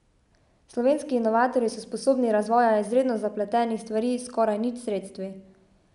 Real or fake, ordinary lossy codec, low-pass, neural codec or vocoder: real; none; 10.8 kHz; none